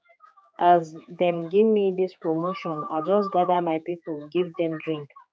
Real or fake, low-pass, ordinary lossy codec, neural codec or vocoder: fake; none; none; codec, 16 kHz, 4 kbps, X-Codec, HuBERT features, trained on general audio